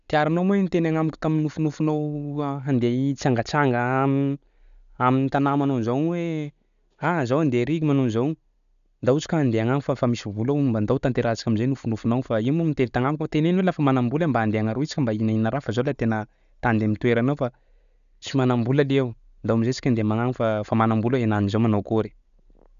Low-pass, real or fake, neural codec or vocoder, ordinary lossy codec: 7.2 kHz; real; none; none